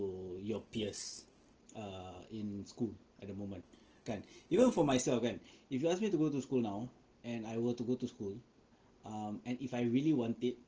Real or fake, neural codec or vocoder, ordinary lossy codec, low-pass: real; none; Opus, 16 kbps; 7.2 kHz